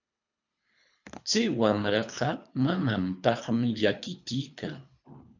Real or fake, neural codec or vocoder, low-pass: fake; codec, 24 kHz, 3 kbps, HILCodec; 7.2 kHz